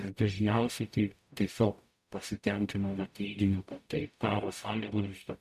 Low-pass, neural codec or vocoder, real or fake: 14.4 kHz; codec, 44.1 kHz, 0.9 kbps, DAC; fake